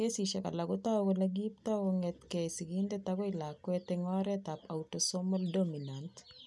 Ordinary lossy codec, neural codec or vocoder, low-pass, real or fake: none; none; none; real